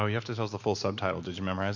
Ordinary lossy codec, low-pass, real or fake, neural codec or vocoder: MP3, 48 kbps; 7.2 kHz; real; none